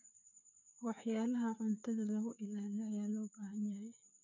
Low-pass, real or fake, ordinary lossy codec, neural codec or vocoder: 7.2 kHz; fake; none; codec, 16 kHz, 4 kbps, FreqCodec, larger model